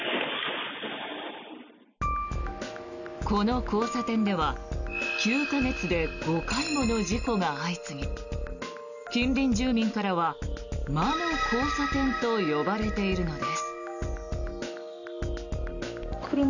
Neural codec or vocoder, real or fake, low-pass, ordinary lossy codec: none; real; 7.2 kHz; none